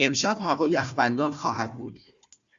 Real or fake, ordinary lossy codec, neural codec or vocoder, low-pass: fake; Opus, 64 kbps; codec, 16 kHz, 1 kbps, FunCodec, trained on Chinese and English, 50 frames a second; 7.2 kHz